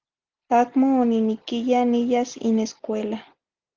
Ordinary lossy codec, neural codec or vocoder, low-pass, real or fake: Opus, 32 kbps; none; 7.2 kHz; real